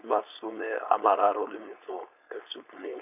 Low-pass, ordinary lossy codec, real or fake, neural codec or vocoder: 3.6 kHz; none; fake; codec, 16 kHz, 4.8 kbps, FACodec